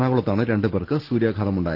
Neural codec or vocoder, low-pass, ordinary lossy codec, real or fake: none; 5.4 kHz; Opus, 16 kbps; real